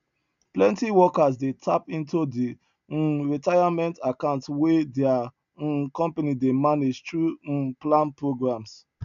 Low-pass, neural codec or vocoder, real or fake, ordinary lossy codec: 7.2 kHz; none; real; none